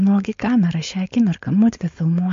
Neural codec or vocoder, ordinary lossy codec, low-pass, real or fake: codec, 16 kHz, 4.8 kbps, FACodec; MP3, 48 kbps; 7.2 kHz; fake